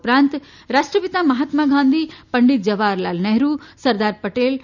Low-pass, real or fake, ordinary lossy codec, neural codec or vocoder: 7.2 kHz; real; none; none